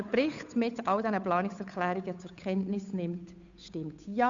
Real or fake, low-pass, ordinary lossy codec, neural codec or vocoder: fake; 7.2 kHz; Opus, 64 kbps; codec, 16 kHz, 8 kbps, FunCodec, trained on Chinese and English, 25 frames a second